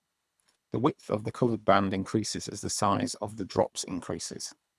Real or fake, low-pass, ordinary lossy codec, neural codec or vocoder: fake; none; none; codec, 24 kHz, 3 kbps, HILCodec